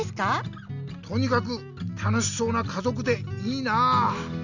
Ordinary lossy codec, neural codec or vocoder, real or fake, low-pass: none; none; real; 7.2 kHz